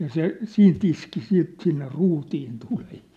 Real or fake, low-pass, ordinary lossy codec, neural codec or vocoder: real; 14.4 kHz; none; none